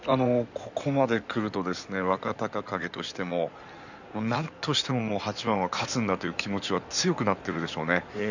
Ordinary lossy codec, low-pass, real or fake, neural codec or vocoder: none; 7.2 kHz; fake; codec, 16 kHz in and 24 kHz out, 2.2 kbps, FireRedTTS-2 codec